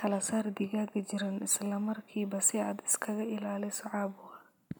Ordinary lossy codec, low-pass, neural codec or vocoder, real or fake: none; none; none; real